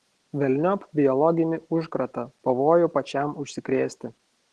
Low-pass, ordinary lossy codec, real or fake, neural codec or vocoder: 10.8 kHz; Opus, 16 kbps; real; none